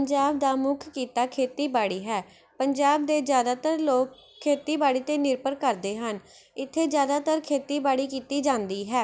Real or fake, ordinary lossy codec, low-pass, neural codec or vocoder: real; none; none; none